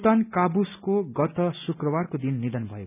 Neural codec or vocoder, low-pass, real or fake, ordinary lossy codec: none; 3.6 kHz; real; none